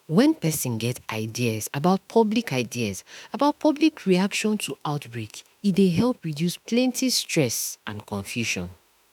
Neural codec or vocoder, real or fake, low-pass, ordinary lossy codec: autoencoder, 48 kHz, 32 numbers a frame, DAC-VAE, trained on Japanese speech; fake; 19.8 kHz; none